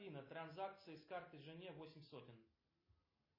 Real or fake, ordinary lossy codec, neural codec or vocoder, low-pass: real; MP3, 24 kbps; none; 5.4 kHz